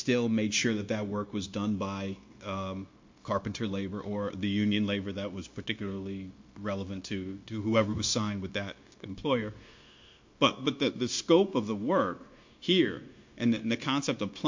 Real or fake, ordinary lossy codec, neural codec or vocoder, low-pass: fake; MP3, 48 kbps; codec, 16 kHz, 0.9 kbps, LongCat-Audio-Codec; 7.2 kHz